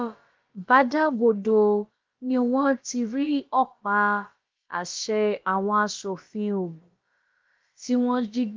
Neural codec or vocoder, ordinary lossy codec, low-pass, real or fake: codec, 16 kHz, about 1 kbps, DyCAST, with the encoder's durations; Opus, 32 kbps; 7.2 kHz; fake